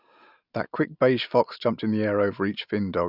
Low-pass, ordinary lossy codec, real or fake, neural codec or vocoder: 5.4 kHz; none; real; none